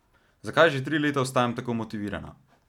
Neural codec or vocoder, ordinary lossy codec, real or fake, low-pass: none; none; real; 19.8 kHz